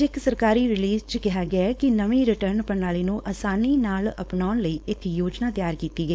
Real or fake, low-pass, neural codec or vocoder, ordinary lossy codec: fake; none; codec, 16 kHz, 4.8 kbps, FACodec; none